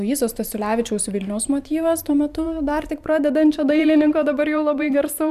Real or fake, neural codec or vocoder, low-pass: fake; vocoder, 44.1 kHz, 128 mel bands every 512 samples, BigVGAN v2; 14.4 kHz